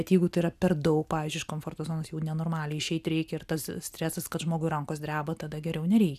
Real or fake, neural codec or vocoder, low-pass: real; none; 14.4 kHz